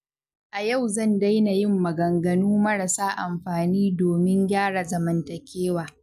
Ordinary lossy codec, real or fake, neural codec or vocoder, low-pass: none; real; none; 14.4 kHz